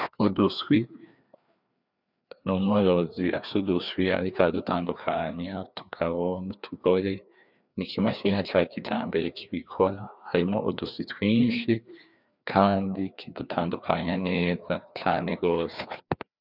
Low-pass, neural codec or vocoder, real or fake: 5.4 kHz; codec, 16 kHz, 2 kbps, FreqCodec, larger model; fake